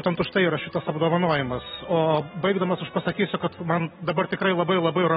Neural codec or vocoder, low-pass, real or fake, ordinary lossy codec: none; 19.8 kHz; real; AAC, 16 kbps